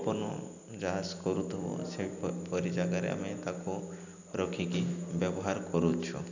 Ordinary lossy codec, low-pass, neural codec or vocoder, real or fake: none; 7.2 kHz; none; real